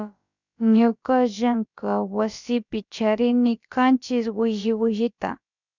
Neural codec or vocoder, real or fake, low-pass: codec, 16 kHz, about 1 kbps, DyCAST, with the encoder's durations; fake; 7.2 kHz